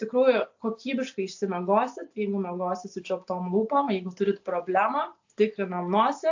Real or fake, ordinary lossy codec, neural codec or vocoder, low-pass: real; AAC, 48 kbps; none; 7.2 kHz